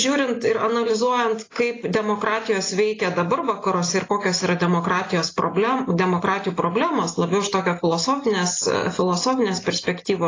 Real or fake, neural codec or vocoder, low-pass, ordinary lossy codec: real; none; 7.2 kHz; AAC, 32 kbps